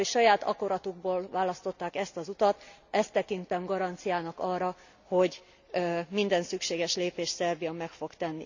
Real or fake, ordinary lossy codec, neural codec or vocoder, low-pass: real; none; none; 7.2 kHz